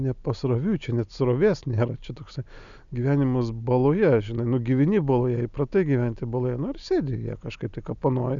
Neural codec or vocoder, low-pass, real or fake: none; 7.2 kHz; real